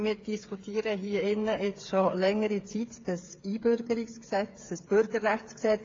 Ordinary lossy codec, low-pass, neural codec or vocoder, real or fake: AAC, 32 kbps; 7.2 kHz; codec, 16 kHz, 8 kbps, FreqCodec, smaller model; fake